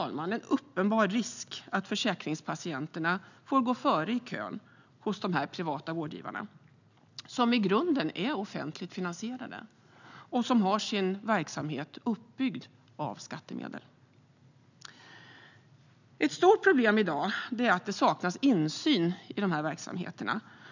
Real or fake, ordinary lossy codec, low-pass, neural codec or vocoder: real; none; 7.2 kHz; none